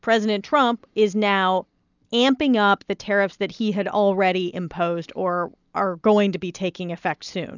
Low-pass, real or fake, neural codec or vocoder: 7.2 kHz; real; none